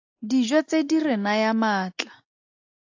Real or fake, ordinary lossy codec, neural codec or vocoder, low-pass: real; AAC, 48 kbps; none; 7.2 kHz